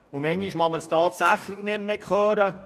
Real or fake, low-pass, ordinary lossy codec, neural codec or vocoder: fake; 14.4 kHz; none; codec, 44.1 kHz, 2.6 kbps, DAC